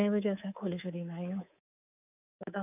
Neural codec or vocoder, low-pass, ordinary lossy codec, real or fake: codec, 24 kHz, 3.1 kbps, DualCodec; 3.6 kHz; none; fake